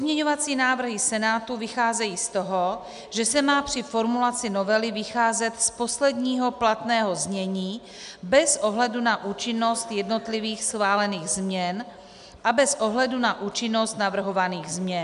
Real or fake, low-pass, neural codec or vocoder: real; 10.8 kHz; none